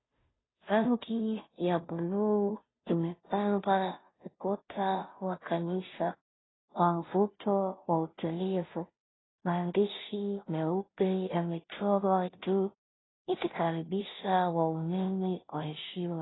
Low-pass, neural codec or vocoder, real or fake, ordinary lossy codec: 7.2 kHz; codec, 16 kHz, 0.5 kbps, FunCodec, trained on Chinese and English, 25 frames a second; fake; AAC, 16 kbps